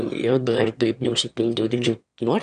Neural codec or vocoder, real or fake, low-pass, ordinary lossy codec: autoencoder, 22.05 kHz, a latent of 192 numbers a frame, VITS, trained on one speaker; fake; 9.9 kHz; AAC, 96 kbps